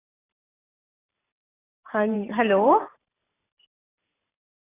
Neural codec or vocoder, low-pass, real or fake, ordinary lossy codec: vocoder, 44.1 kHz, 128 mel bands every 512 samples, BigVGAN v2; 3.6 kHz; fake; AAC, 16 kbps